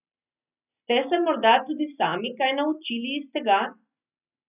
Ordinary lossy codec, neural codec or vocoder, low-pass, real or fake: none; none; 3.6 kHz; real